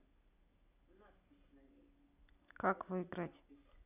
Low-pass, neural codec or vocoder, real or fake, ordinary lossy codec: 3.6 kHz; none; real; none